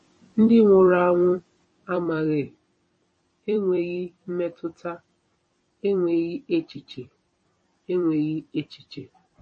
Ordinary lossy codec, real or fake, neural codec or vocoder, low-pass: MP3, 32 kbps; fake; vocoder, 44.1 kHz, 128 mel bands every 256 samples, BigVGAN v2; 9.9 kHz